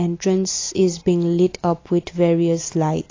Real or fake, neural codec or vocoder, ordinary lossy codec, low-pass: real; none; AAC, 32 kbps; 7.2 kHz